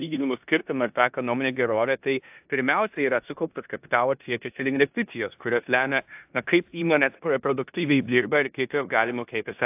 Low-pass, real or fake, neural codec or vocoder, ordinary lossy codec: 3.6 kHz; fake; codec, 16 kHz in and 24 kHz out, 0.9 kbps, LongCat-Audio-Codec, four codebook decoder; AAC, 32 kbps